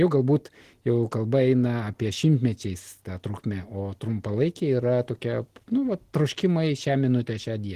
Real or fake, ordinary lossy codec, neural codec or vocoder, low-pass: real; Opus, 16 kbps; none; 14.4 kHz